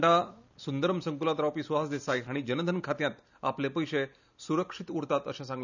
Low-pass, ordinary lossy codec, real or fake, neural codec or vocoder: 7.2 kHz; none; real; none